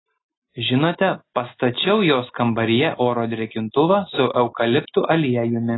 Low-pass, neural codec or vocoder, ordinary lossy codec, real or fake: 7.2 kHz; none; AAC, 16 kbps; real